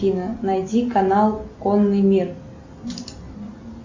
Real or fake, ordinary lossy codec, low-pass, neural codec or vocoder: real; MP3, 64 kbps; 7.2 kHz; none